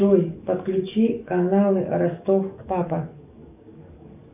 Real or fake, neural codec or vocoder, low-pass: fake; codec, 44.1 kHz, 7.8 kbps, DAC; 3.6 kHz